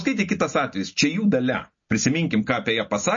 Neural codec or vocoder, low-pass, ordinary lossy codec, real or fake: none; 7.2 kHz; MP3, 32 kbps; real